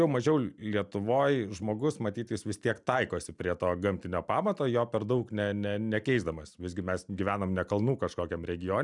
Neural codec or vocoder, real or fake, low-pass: none; real; 10.8 kHz